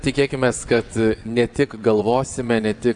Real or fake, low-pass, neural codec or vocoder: fake; 9.9 kHz; vocoder, 22.05 kHz, 80 mel bands, WaveNeXt